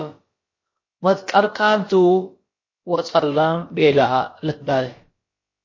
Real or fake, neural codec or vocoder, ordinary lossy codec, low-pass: fake; codec, 16 kHz, about 1 kbps, DyCAST, with the encoder's durations; MP3, 32 kbps; 7.2 kHz